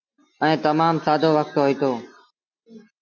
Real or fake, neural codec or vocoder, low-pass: real; none; 7.2 kHz